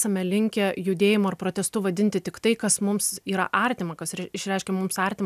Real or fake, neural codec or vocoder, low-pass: real; none; 14.4 kHz